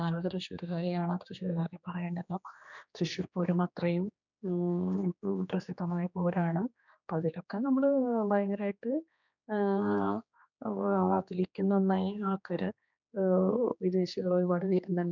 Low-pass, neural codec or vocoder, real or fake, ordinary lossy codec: 7.2 kHz; codec, 16 kHz, 2 kbps, X-Codec, HuBERT features, trained on general audio; fake; none